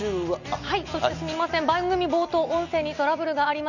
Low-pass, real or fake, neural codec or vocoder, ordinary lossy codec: 7.2 kHz; real; none; none